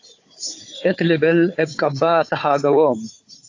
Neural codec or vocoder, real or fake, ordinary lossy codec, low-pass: codec, 16 kHz, 4 kbps, FunCodec, trained on Chinese and English, 50 frames a second; fake; AAC, 48 kbps; 7.2 kHz